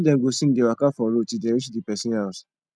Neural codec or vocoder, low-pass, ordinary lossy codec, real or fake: none; none; none; real